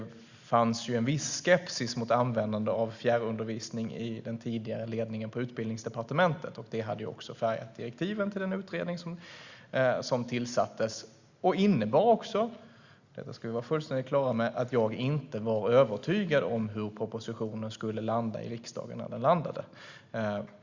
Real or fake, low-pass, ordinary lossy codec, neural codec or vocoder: real; 7.2 kHz; Opus, 64 kbps; none